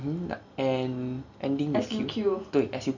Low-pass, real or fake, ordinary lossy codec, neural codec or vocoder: 7.2 kHz; real; none; none